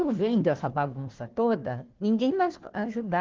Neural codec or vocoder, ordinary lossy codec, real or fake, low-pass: codec, 16 kHz, 1 kbps, FunCodec, trained on Chinese and English, 50 frames a second; Opus, 16 kbps; fake; 7.2 kHz